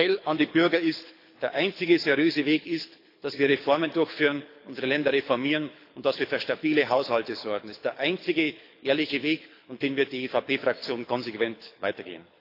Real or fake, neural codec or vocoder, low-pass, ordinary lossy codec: fake; codec, 24 kHz, 6 kbps, HILCodec; 5.4 kHz; AAC, 32 kbps